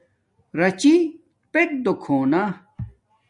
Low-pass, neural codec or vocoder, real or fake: 10.8 kHz; none; real